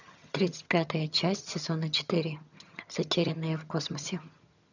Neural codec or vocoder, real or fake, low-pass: vocoder, 22.05 kHz, 80 mel bands, HiFi-GAN; fake; 7.2 kHz